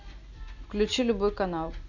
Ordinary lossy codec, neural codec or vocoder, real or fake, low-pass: none; none; real; 7.2 kHz